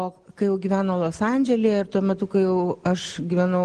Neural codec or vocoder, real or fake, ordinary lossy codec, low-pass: none; real; Opus, 16 kbps; 9.9 kHz